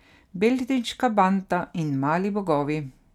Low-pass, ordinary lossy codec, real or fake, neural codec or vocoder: 19.8 kHz; none; real; none